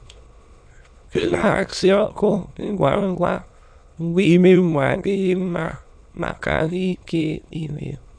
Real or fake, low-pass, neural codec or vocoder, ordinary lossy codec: fake; 9.9 kHz; autoencoder, 22.05 kHz, a latent of 192 numbers a frame, VITS, trained on many speakers; none